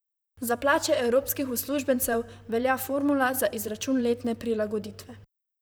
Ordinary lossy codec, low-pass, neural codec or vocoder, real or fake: none; none; vocoder, 44.1 kHz, 128 mel bands, Pupu-Vocoder; fake